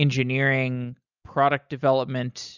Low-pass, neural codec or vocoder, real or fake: 7.2 kHz; none; real